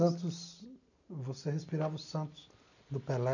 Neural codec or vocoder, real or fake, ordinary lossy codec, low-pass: none; real; none; 7.2 kHz